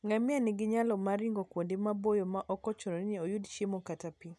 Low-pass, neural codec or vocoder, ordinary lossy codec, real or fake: none; none; none; real